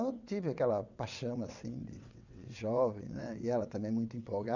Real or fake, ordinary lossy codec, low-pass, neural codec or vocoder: fake; none; 7.2 kHz; vocoder, 22.05 kHz, 80 mel bands, WaveNeXt